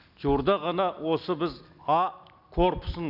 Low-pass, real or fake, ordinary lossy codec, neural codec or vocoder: 5.4 kHz; real; none; none